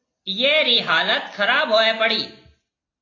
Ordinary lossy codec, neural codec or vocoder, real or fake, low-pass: AAC, 32 kbps; none; real; 7.2 kHz